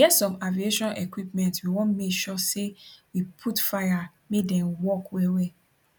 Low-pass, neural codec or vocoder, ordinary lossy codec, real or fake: 19.8 kHz; none; none; real